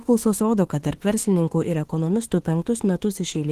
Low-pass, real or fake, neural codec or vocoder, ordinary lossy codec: 14.4 kHz; fake; autoencoder, 48 kHz, 32 numbers a frame, DAC-VAE, trained on Japanese speech; Opus, 16 kbps